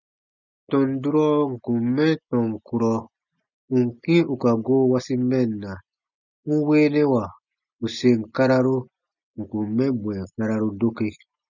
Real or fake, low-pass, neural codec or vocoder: real; 7.2 kHz; none